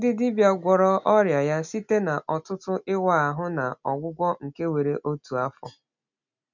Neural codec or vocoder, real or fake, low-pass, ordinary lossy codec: none; real; 7.2 kHz; none